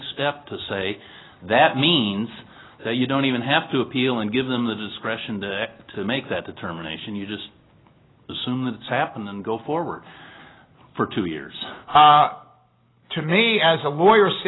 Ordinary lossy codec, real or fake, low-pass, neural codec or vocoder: AAC, 16 kbps; real; 7.2 kHz; none